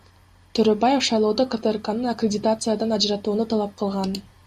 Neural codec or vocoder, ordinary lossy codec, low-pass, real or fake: none; Opus, 64 kbps; 14.4 kHz; real